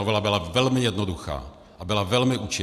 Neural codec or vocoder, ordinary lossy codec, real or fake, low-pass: none; AAC, 96 kbps; real; 14.4 kHz